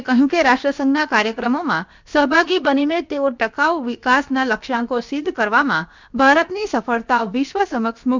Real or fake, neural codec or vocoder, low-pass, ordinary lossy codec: fake; codec, 16 kHz, about 1 kbps, DyCAST, with the encoder's durations; 7.2 kHz; MP3, 64 kbps